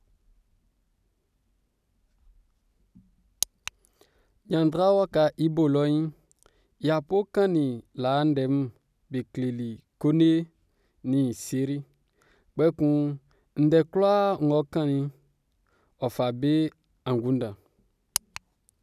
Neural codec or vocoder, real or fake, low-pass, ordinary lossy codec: none; real; 14.4 kHz; none